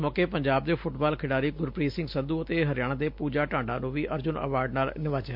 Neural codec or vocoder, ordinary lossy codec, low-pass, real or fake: none; MP3, 48 kbps; 5.4 kHz; real